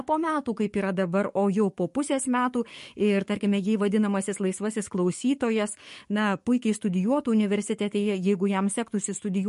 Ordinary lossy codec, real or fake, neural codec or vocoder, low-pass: MP3, 48 kbps; fake; codec, 44.1 kHz, 7.8 kbps, DAC; 14.4 kHz